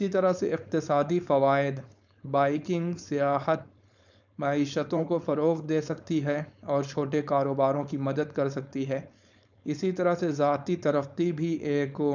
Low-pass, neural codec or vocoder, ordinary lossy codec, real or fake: 7.2 kHz; codec, 16 kHz, 4.8 kbps, FACodec; none; fake